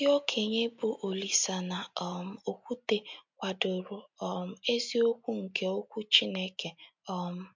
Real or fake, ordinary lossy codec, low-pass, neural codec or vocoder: real; MP3, 64 kbps; 7.2 kHz; none